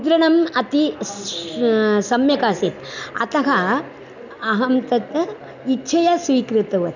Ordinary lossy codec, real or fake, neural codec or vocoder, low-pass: none; real; none; 7.2 kHz